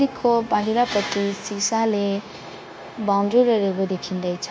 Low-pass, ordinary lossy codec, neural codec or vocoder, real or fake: none; none; codec, 16 kHz, 0.9 kbps, LongCat-Audio-Codec; fake